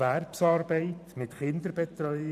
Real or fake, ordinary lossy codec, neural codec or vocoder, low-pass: fake; none; vocoder, 44.1 kHz, 128 mel bands every 512 samples, BigVGAN v2; 14.4 kHz